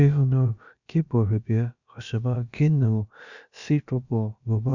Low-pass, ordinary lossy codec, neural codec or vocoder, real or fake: 7.2 kHz; none; codec, 16 kHz, about 1 kbps, DyCAST, with the encoder's durations; fake